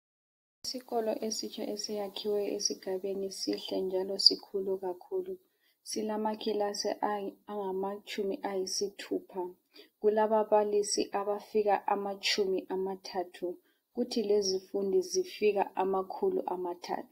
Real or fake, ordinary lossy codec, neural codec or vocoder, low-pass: real; AAC, 48 kbps; none; 19.8 kHz